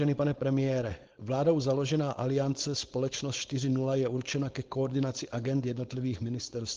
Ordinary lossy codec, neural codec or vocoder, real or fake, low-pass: Opus, 16 kbps; codec, 16 kHz, 4.8 kbps, FACodec; fake; 7.2 kHz